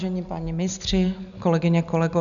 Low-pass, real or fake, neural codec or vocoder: 7.2 kHz; real; none